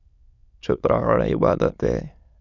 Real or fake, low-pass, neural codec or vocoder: fake; 7.2 kHz; autoencoder, 22.05 kHz, a latent of 192 numbers a frame, VITS, trained on many speakers